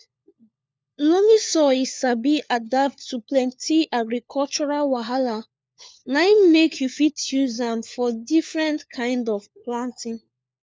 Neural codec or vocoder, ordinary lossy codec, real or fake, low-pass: codec, 16 kHz, 4 kbps, FunCodec, trained on LibriTTS, 50 frames a second; none; fake; none